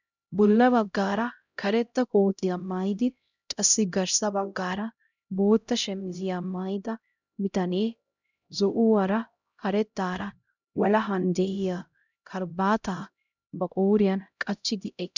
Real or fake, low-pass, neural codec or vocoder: fake; 7.2 kHz; codec, 16 kHz, 0.5 kbps, X-Codec, HuBERT features, trained on LibriSpeech